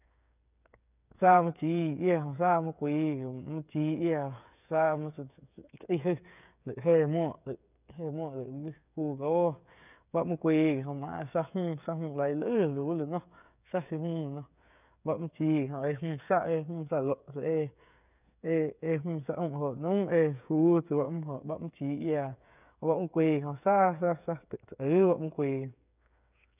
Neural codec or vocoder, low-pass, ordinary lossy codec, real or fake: codec, 16 kHz, 8 kbps, FreqCodec, smaller model; 3.6 kHz; none; fake